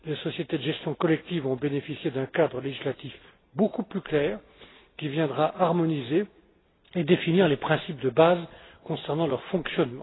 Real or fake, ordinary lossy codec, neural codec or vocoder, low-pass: real; AAC, 16 kbps; none; 7.2 kHz